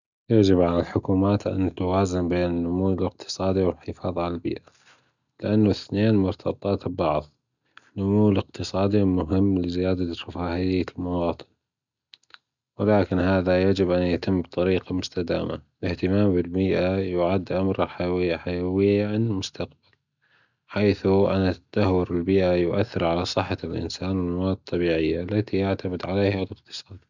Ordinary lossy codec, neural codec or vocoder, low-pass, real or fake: none; none; 7.2 kHz; real